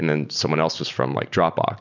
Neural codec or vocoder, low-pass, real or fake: none; 7.2 kHz; real